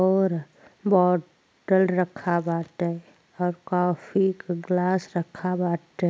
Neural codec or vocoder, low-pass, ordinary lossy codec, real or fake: none; none; none; real